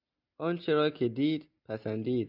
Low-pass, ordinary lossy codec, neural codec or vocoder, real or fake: 5.4 kHz; AAC, 48 kbps; none; real